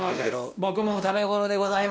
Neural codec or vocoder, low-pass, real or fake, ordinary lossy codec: codec, 16 kHz, 1 kbps, X-Codec, WavLM features, trained on Multilingual LibriSpeech; none; fake; none